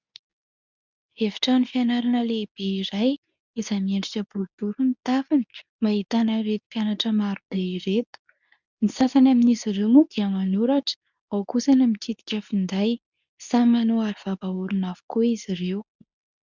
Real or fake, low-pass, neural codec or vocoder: fake; 7.2 kHz; codec, 24 kHz, 0.9 kbps, WavTokenizer, medium speech release version 2